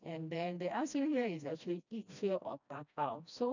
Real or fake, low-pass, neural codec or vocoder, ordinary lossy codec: fake; 7.2 kHz; codec, 16 kHz, 1 kbps, FreqCodec, smaller model; none